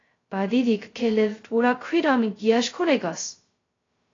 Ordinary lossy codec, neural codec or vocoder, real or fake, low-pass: AAC, 32 kbps; codec, 16 kHz, 0.2 kbps, FocalCodec; fake; 7.2 kHz